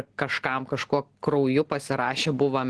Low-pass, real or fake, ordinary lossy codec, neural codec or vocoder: 10.8 kHz; real; Opus, 16 kbps; none